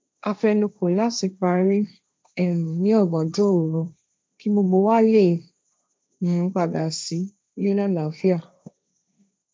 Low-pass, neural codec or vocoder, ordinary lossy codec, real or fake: none; codec, 16 kHz, 1.1 kbps, Voila-Tokenizer; none; fake